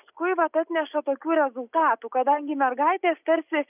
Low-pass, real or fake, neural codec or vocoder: 3.6 kHz; real; none